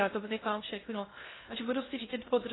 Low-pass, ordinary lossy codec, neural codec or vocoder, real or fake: 7.2 kHz; AAC, 16 kbps; codec, 16 kHz in and 24 kHz out, 0.6 kbps, FocalCodec, streaming, 2048 codes; fake